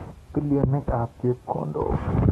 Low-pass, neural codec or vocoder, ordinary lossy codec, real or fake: 19.8 kHz; codec, 44.1 kHz, 7.8 kbps, DAC; AAC, 32 kbps; fake